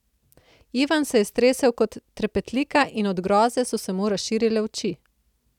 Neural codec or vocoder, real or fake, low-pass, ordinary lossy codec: none; real; 19.8 kHz; none